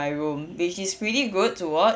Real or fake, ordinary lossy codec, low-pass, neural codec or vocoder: real; none; none; none